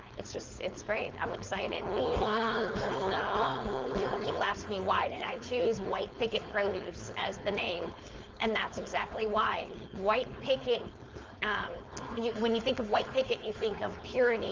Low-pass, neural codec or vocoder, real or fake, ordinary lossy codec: 7.2 kHz; codec, 16 kHz, 4.8 kbps, FACodec; fake; Opus, 32 kbps